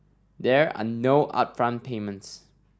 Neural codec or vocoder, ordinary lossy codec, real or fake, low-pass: none; none; real; none